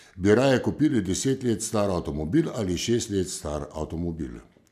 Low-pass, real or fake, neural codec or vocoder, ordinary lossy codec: 14.4 kHz; real; none; MP3, 96 kbps